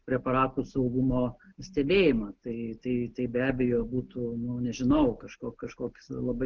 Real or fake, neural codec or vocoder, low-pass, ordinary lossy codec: real; none; 7.2 kHz; Opus, 16 kbps